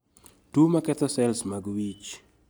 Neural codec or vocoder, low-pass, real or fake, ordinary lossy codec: none; none; real; none